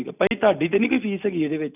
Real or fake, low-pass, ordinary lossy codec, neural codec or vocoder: real; 3.6 kHz; none; none